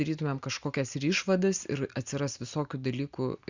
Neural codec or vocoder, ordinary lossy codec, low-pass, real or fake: none; Opus, 64 kbps; 7.2 kHz; real